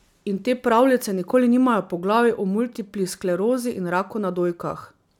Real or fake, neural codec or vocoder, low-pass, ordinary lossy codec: real; none; 19.8 kHz; none